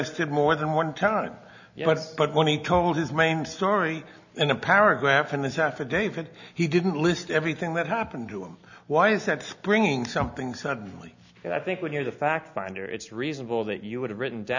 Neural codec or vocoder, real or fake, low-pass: none; real; 7.2 kHz